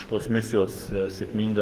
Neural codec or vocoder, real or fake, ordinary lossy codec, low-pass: codec, 44.1 kHz, 2.6 kbps, DAC; fake; Opus, 16 kbps; 14.4 kHz